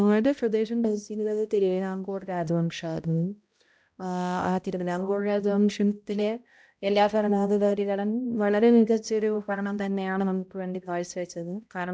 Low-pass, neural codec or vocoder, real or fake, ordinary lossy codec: none; codec, 16 kHz, 0.5 kbps, X-Codec, HuBERT features, trained on balanced general audio; fake; none